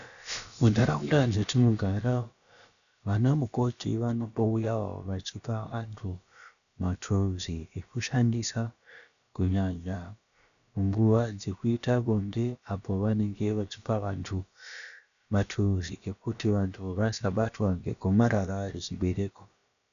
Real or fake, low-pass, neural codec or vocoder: fake; 7.2 kHz; codec, 16 kHz, about 1 kbps, DyCAST, with the encoder's durations